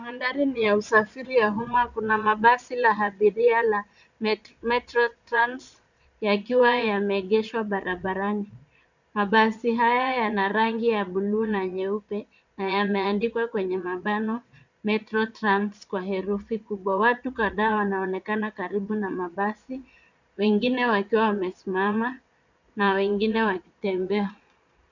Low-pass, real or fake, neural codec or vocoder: 7.2 kHz; fake; vocoder, 22.05 kHz, 80 mel bands, Vocos